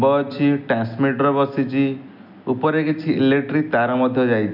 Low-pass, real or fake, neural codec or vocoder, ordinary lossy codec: 5.4 kHz; real; none; none